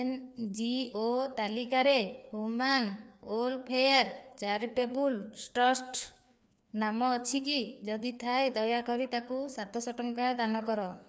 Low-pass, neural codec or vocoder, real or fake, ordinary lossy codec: none; codec, 16 kHz, 2 kbps, FreqCodec, larger model; fake; none